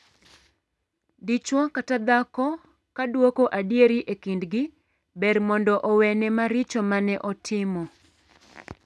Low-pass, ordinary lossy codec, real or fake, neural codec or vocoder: none; none; real; none